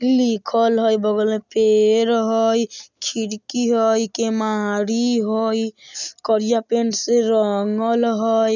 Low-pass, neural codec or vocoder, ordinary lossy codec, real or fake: 7.2 kHz; none; none; real